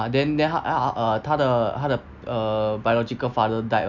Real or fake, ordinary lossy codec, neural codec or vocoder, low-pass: real; none; none; 7.2 kHz